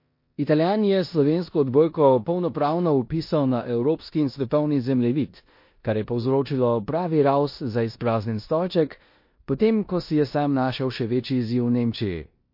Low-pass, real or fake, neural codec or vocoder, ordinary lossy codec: 5.4 kHz; fake; codec, 16 kHz in and 24 kHz out, 0.9 kbps, LongCat-Audio-Codec, four codebook decoder; MP3, 32 kbps